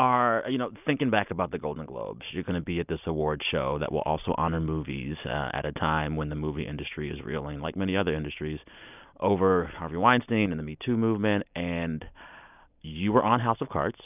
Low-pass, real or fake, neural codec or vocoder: 3.6 kHz; real; none